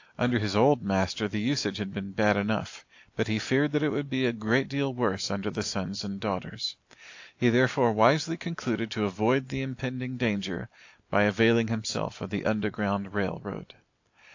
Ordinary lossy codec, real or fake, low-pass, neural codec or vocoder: AAC, 48 kbps; real; 7.2 kHz; none